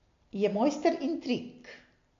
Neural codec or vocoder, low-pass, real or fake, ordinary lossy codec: none; 7.2 kHz; real; AAC, 48 kbps